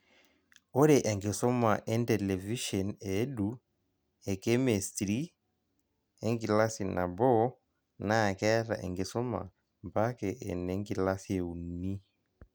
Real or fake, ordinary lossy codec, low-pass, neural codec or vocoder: real; none; none; none